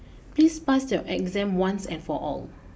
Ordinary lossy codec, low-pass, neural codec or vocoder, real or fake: none; none; none; real